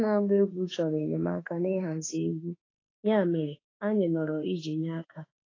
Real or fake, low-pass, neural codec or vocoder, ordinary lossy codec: fake; 7.2 kHz; autoencoder, 48 kHz, 32 numbers a frame, DAC-VAE, trained on Japanese speech; AAC, 32 kbps